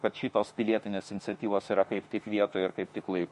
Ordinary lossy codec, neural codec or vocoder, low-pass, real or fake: MP3, 48 kbps; autoencoder, 48 kHz, 32 numbers a frame, DAC-VAE, trained on Japanese speech; 14.4 kHz; fake